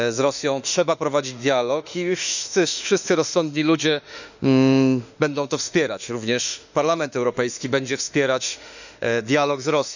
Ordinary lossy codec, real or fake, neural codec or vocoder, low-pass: none; fake; autoencoder, 48 kHz, 32 numbers a frame, DAC-VAE, trained on Japanese speech; 7.2 kHz